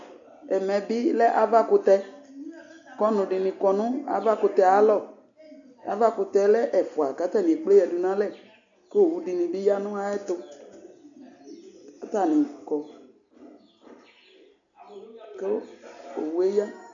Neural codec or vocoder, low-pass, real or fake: none; 7.2 kHz; real